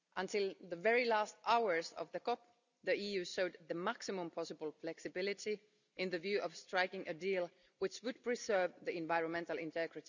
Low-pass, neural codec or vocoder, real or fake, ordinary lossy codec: 7.2 kHz; none; real; none